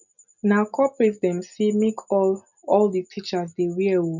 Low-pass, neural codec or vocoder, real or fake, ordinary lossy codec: 7.2 kHz; none; real; none